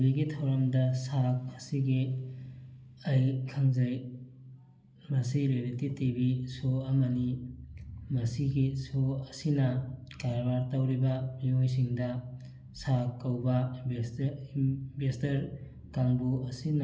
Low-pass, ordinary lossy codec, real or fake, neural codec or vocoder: none; none; real; none